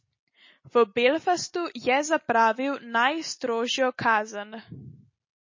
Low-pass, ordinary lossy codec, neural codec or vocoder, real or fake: 7.2 kHz; MP3, 32 kbps; none; real